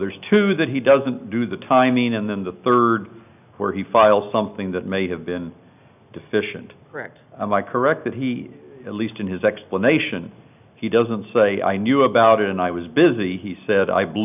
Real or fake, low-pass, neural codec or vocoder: real; 3.6 kHz; none